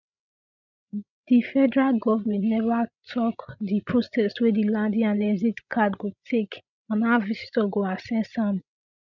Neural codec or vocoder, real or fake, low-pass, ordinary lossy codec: codec, 16 kHz, 16 kbps, FreqCodec, larger model; fake; 7.2 kHz; none